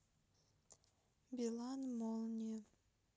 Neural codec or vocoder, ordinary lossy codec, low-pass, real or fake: none; none; none; real